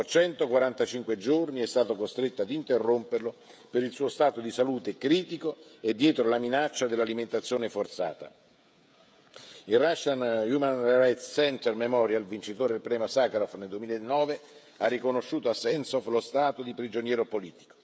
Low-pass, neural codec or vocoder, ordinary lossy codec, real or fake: none; codec, 16 kHz, 16 kbps, FreqCodec, smaller model; none; fake